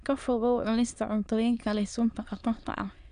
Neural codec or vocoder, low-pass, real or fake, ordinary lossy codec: autoencoder, 22.05 kHz, a latent of 192 numbers a frame, VITS, trained on many speakers; 9.9 kHz; fake; none